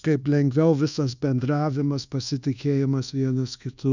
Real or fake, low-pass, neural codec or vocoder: fake; 7.2 kHz; codec, 24 kHz, 1.2 kbps, DualCodec